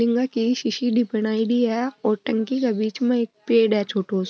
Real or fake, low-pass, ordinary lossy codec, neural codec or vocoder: real; none; none; none